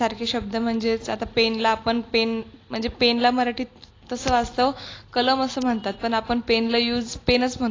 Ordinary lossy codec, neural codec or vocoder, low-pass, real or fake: AAC, 32 kbps; none; 7.2 kHz; real